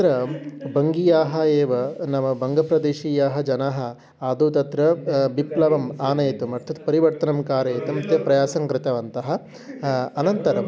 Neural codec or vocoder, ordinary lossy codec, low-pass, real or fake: none; none; none; real